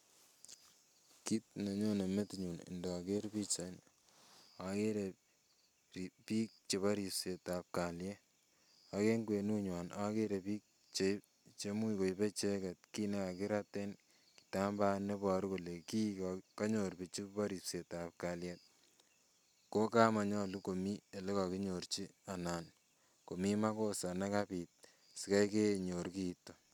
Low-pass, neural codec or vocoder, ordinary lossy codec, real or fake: 19.8 kHz; none; none; real